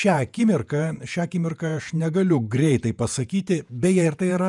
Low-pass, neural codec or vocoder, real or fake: 10.8 kHz; vocoder, 48 kHz, 128 mel bands, Vocos; fake